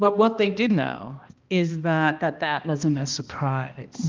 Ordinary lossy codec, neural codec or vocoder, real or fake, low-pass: Opus, 24 kbps; codec, 16 kHz, 1 kbps, X-Codec, HuBERT features, trained on balanced general audio; fake; 7.2 kHz